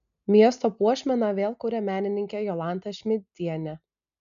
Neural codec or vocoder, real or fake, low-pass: none; real; 7.2 kHz